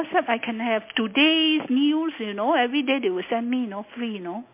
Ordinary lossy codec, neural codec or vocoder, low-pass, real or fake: MP3, 24 kbps; none; 3.6 kHz; real